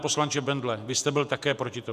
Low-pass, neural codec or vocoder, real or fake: 14.4 kHz; vocoder, 48 kHz, 128 mel bands, Vocos; fake